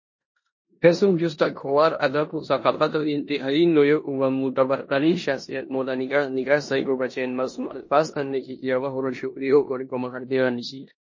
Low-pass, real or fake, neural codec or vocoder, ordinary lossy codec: 7.2 kHz; fake; codec, 16 kHz in and 24 kHz out, 0.9 kbps, LongCat-Audio-Codec, four codebook decoder; MP3, 32 kbps